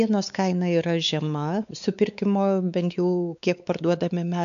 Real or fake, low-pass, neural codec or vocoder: fake; 7.2 kHz; codec, 16 kHz, 4 kbps, X-Codec, WavLM features, trained on Multilingual LibriSpeech